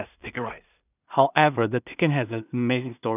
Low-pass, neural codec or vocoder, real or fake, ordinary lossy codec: 3.6 kHz; codec, 16 kHz in and 24 kHz out, 0.4 kbps, LongCat-Audio-Codec, two codebook decoder; fake; none